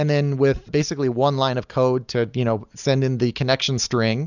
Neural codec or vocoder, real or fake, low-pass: none; real; 7.2 kHz